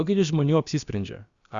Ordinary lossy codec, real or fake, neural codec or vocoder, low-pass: Opus, 64 kbps; fake; codec, 16 kHz, about 1 kbps, DyCAST, with the encoder's durations; 7.2 kHz